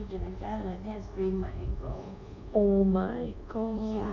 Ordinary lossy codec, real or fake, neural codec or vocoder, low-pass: none; fake; codec, 24 kHz, 1.2 kbps, DualCodec; 7.2 kHz